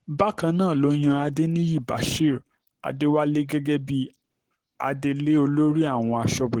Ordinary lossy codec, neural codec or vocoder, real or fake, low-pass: Opus, 16 kbps; codec, 44.1 kHz, 7.8 kbps, Pupu-Codec; fake; 19.8 kHz